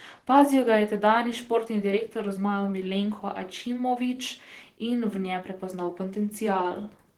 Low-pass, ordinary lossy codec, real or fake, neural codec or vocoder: 19.8 kHz; Opus, 16 kbps; fake; vocoder, 44.1 kHz, 128 mel bands, Pupu-Vocoder